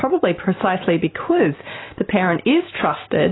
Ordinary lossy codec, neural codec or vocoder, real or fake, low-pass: AAC, 16 kbps; none; real; 7.2 kHz